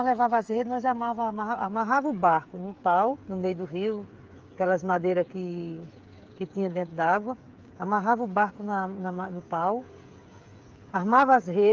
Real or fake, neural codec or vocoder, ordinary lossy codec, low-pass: fake; codec, 16 kHz, 8 kbps, FreqCodec, smaller model; Opus, 32 kbps; 7.2 kHz